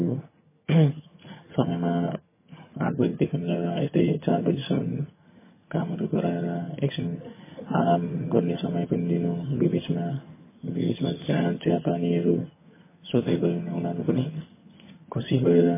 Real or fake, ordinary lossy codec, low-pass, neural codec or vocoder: fake; MP3, 16 kbps; 3.6 kHz; vocoder, 22.05 kHz, 80 mel bands, HiFi-GAN